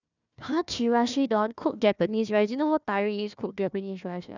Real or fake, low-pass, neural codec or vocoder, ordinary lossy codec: fake; 7.2 kHz; codec, 16 kHz, 1 kbps, FunCodec, trained on Chinese and English, 50 frames a second; none